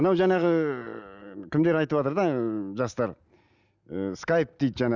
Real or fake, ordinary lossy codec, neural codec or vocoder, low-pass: real; none; none; 7.2 kHz